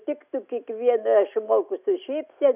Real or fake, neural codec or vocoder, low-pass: real; none; 3.6 kHz